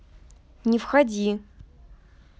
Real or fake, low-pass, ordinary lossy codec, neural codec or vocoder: real; none; none; none